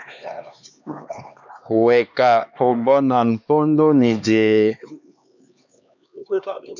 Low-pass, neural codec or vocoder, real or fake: 7.2 kHz; codec, 16 kHz, 2 kbps, X-Codec, HuBERT features, trained on LibriSpeech; fake